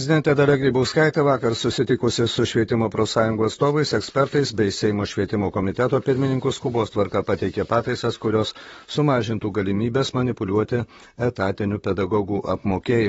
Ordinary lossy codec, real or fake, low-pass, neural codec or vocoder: AAC, 24 kbps; fake; 19.8 kHz; vocoder, 44.1 kHz, 128 mel bands, Pupu-Vocoder